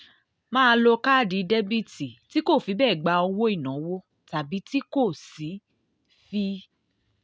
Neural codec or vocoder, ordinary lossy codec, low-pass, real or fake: none; none; none; real